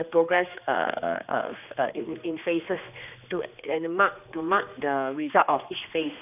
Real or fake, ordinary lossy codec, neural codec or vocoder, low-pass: fake; none; codec, 16 kHz, 2 kbps, X-Codec, HuBERT features, trained on general audio; 3.6 kHz